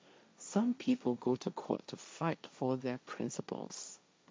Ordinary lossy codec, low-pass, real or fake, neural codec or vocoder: none; none; fake; codec, 16 kHz, 1.1 kbps, Voila-Tokenizer